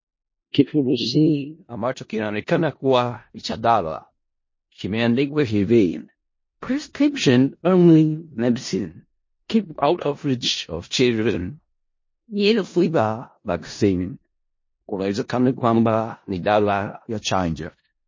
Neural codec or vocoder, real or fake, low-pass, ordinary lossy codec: codec, 16 kHz in and 24 kHz out, 0.4 kbps, LongCat-Audio-Codec, four codebook decoder; fake; 7.2 kHz; MP3, 32 kbps